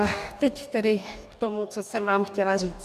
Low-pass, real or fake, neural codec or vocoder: 14.4 kHz; fake; codec, 44.1 kHz, 2.6 kbps, DAC